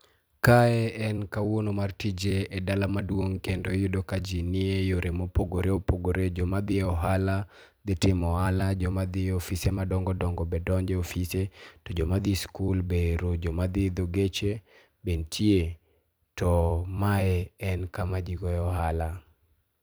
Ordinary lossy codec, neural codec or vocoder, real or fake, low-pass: none; vocoder, 44.1 kHz, 128 mel bands every 256 samples, BigVGAN v2; fake; none